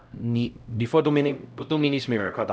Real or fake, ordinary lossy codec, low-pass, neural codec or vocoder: fake; none; none; codec, 16 kHz, 0.5 kbps, X-Codec, HuBERT features, trained on LibriSpeech